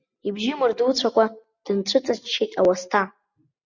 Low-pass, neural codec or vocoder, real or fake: 7.2 kHz; none; real